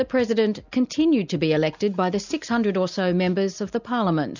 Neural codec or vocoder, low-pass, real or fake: none; 7.2 kHz; real